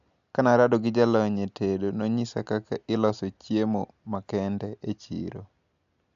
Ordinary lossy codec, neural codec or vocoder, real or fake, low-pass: AAC, 96 kbps; none; real; 7.2 kHz